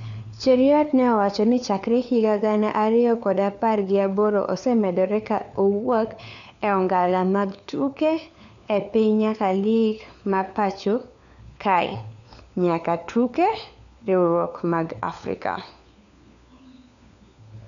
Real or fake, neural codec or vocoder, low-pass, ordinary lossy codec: fake; codec, 16 kHz, 4 kbps, FunCodec, trained on LibriTTS, 50 frames a second; 7.2 kHz; none